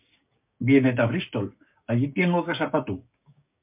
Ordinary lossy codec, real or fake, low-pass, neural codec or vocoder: MP3, 32 kbps; fake; 3.6 kHz; codec, 16 kHz, 6 kbps, DAC